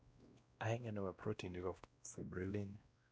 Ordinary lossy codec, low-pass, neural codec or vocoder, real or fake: none; none; codec, 16 kHz, 1 kbps, X-Codec, WavLM features, trained on Multilingual LibriSpeech; fake